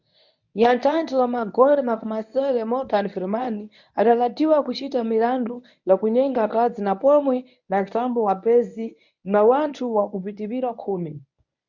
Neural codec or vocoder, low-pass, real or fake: codec, 24 kHz, 0.9 kbps, WavTokenizer, medium speech release version 1; 7.2 kHz; fake